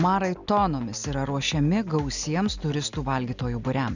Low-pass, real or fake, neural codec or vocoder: 7.2 kHz; real; none